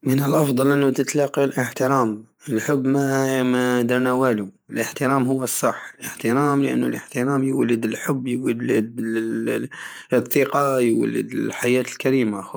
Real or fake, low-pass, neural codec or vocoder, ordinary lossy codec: fake; none; vocoder, 48 kHz, 128 mel bands, Vocos; none